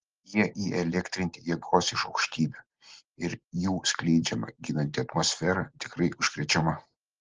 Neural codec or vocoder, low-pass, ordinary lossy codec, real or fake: none; 7.2 kHz; Opus, 16 kbps; real